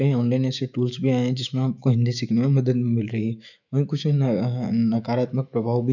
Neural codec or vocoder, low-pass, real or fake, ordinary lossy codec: autoencoder, 48 kHz, 128 numbers a frame, DAC-VAE, trained on Japanese speech; 7.2 kHz; fake; none